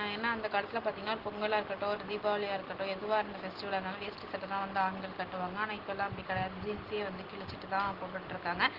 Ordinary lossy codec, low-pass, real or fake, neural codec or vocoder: Opus, 16 kbps; 5.4 kHz; real; none